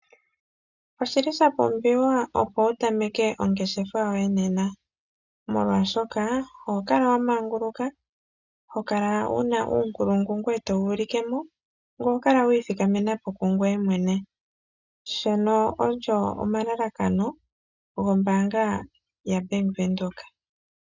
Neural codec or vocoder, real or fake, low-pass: none; real; 7.2 kHz